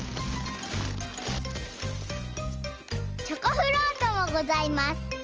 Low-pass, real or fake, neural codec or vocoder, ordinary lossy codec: 7.2 kHz; real; none; Opus, 24 kbps